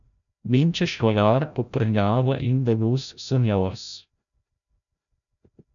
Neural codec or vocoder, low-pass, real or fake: codec, 16 kHz, 0.5 kbps, FreqCodec, larger model; 7.2 kHz; fake